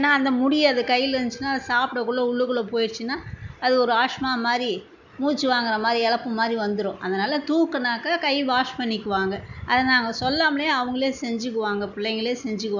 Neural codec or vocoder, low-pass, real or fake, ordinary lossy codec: none; 7.2 kHz; real; none